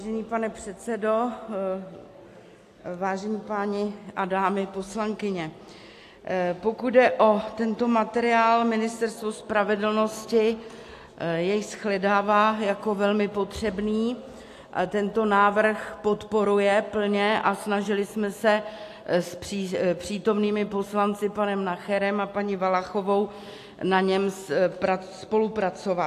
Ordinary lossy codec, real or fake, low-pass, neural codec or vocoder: AAC, 64 kbps; real; 14.4 kHz; none